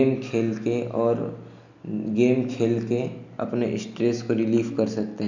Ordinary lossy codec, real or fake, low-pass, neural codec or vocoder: none; real; 7.2 kHz; none